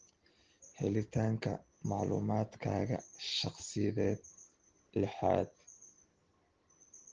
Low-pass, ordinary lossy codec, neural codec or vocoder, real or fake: 7.2 kHz; Opus, 16 kbps; none; real